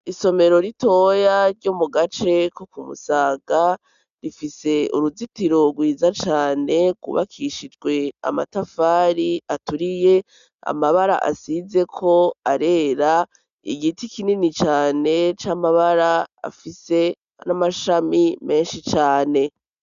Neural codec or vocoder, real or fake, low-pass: none; real; 7.2 kHz